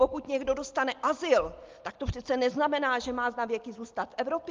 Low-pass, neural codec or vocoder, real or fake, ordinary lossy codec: 7.2 kHz; none; real; Opus, 32 kbps